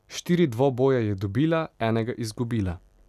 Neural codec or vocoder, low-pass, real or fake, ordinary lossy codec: none; 14.4 kHz; real; none